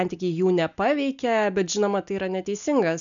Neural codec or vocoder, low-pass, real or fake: none; 7.2 kHz; real